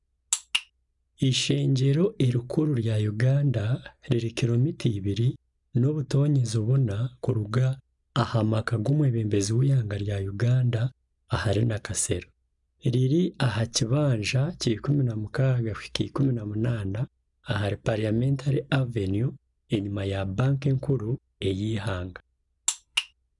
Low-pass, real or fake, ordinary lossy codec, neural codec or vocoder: 10.8 kHz; real; none; none